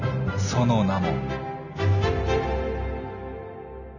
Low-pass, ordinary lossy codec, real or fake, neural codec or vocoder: 7.2 kHz; none; real; none